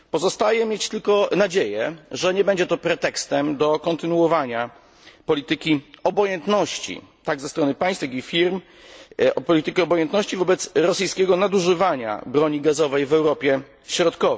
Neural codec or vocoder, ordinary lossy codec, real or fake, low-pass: none; none; real; none